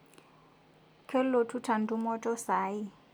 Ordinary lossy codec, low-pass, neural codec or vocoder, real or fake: none; none; none; real